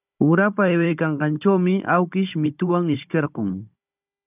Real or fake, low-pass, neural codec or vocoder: fake; 3.6 kHz; codec, 16 kHz, 16 kbps, FunCodec, trained on Chinese and English, 50 frames a second